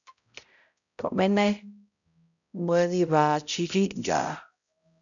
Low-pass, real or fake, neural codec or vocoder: 7.2 kHz; fake; codec, 16 kHz, 0.5 kbps, X-Codec, HuBERT features, trained on balanced general audio